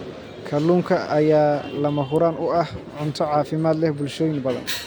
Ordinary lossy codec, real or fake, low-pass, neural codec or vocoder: none; real; none; none